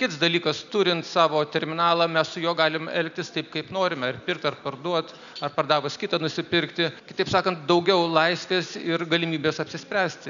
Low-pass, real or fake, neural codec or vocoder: 7.2 kHz; real; none